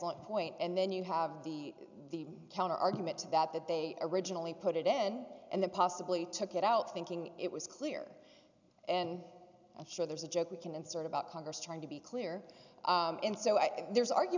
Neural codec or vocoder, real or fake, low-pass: none; real; 7.2 kHz